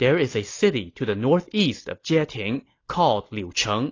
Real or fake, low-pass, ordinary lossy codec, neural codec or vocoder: real; 7.2 kHz; AAC, 32 kbps; none